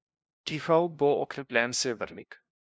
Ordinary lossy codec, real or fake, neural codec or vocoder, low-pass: none; fake; codec, 16 kHz, 0.5 kbps, FunCodec, trained on LibriTTS, 25 frames a second; none